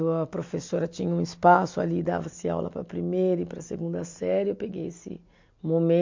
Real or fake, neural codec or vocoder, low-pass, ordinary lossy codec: real; none; 7.2 kHz; MP3, 48 kbps